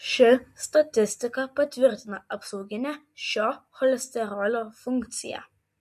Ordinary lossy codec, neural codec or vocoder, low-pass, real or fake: MP3, 64 kbps; none; 14.4 kHz; real